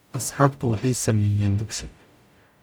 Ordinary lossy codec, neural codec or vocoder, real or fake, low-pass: none; codec, 44.1 kHz, 0.9 kbps, DAC; fake; none